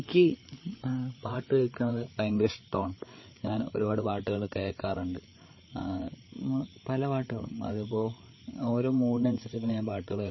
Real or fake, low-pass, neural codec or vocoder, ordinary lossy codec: fake; 7.2 kHz; codec, 16 kHz, 8 kbps, FreqCodec, larger model; MP3, 24 kbps